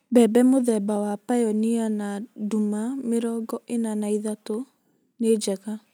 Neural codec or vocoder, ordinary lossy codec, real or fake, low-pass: none; none; real; none